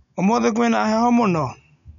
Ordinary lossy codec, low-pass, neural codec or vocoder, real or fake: none; 7.2 kHz; none; real